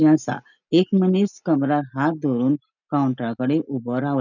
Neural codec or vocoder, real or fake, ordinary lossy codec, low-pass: none; real; none; 7.2 kHz